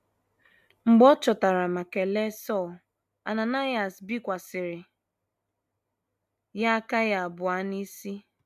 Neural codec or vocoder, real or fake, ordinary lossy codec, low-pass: none; real; MP3, 96 kbps; 14.4 kHz